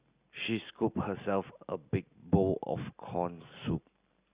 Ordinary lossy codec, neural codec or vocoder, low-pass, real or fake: Opus, 64 kbps; none; 3.6 kHz; real